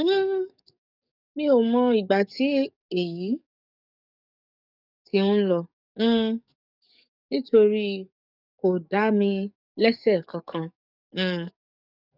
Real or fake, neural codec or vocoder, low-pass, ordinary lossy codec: fake; codec, 44.1 kHz, 7.8 kbps, DAC; 5.4 kHz; none